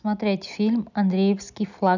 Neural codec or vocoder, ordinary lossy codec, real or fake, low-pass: none; none; real; 7.2 kHz